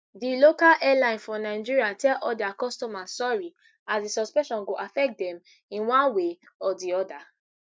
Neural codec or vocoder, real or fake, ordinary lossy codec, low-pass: none; real; none; none